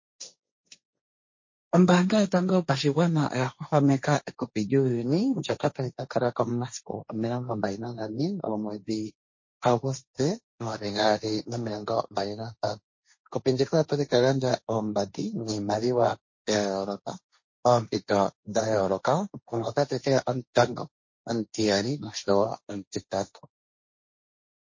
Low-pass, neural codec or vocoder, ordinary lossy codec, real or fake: 7.2 kHz; codec, 16 kHz, 1.1 kbps, Voila-Tokenizer; MP3, 32 kbps; fake